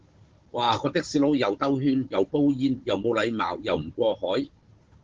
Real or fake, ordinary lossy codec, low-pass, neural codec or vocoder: fake; Opus, 16 kbps; 7.2 kHz; codec, 16 kHz, 16 kbps, FunCodec, trained on Chinese and English, 50 frames a second